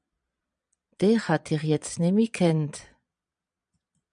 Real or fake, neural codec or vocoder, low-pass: fake; vocoder, 22.05 kHz, 80 mel bands, Vocos; 9.9 kHz